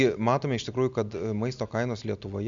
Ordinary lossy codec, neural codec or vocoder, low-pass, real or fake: MP3, 64 kbps; none; 7.2 kHz; real